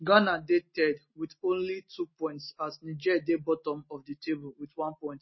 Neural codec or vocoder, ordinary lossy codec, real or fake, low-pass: none; MP3, 24 kbps; real; 7.2 kHz